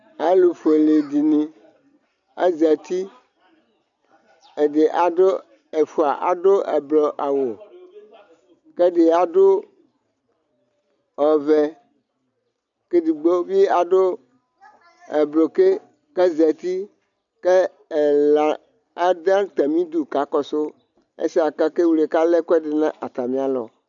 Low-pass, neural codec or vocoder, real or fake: 7.2 kHz; none; real